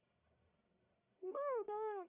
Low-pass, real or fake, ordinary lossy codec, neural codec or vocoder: 3.6 kHz; fake; none; codec, 44.1 kHz, 1.7 kbps, Pupu-Codec